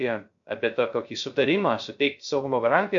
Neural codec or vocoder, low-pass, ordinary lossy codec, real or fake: codec, 16 kHz, 0.3 kbps, FocalCodec; 7.2 kHz; MP3, 48 kbps; fake